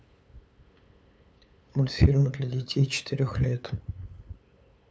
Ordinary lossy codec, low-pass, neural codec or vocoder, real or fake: none; none; codec, 16 kHz, 8 kbps, FunCodec, trained on LibriTTS, 25 frames a second; fake